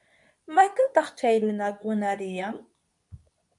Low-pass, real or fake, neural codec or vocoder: 10.8 kHz; fake; codec, 24 kHz, 0.9 kbps, WavTokenizer, medium speech release version 2